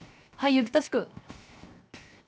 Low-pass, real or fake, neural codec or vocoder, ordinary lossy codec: none; fake; codec, 16 kHz, 0.7 kbps, FocalCodec; none